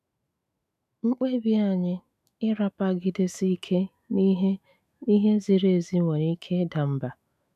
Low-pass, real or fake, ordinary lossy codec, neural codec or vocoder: 14.4 kHz; fake; none; autoencoder, 48 kHz, 128 numbers a frame, DAC-VAE, trained on Japanese speech